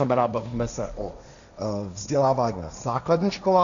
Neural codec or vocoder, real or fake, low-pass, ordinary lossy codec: codec, 16 kHz, 1.1 kbps, Voila-Tokenizer; fake; 7.2 kHz; MP3, 96 kbps